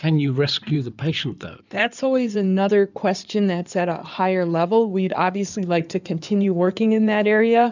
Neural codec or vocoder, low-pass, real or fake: codec, 16 kHz in and 24 kHz out, 2.2 kbps, FireRedTTS-2 codec; 7.2 kHz; fake